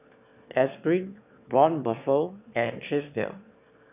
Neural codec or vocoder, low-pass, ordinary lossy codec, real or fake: autoencoder, 22.05 kHz, a latent of 192 numbers a frame, VITS, trained on one speaker; 3.6 kHz; none; fake